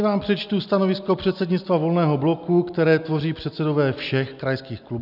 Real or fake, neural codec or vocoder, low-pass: real; none; 5.4 kHz